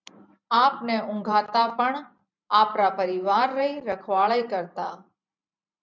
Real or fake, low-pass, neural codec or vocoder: fake; 7.2 kHz; vocoder, 44.1 kHz, 128 mel bands every 512 samples, BigVGAN v2